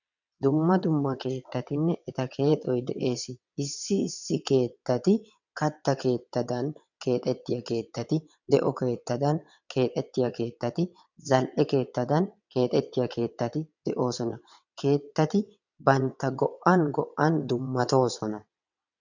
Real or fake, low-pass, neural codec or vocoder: fake; 7.2 kHz; vocoder, 22.05 kHz, 80 mel bands, WaveNeXt